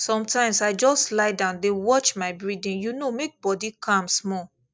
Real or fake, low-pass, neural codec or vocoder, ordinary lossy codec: real; none; none; none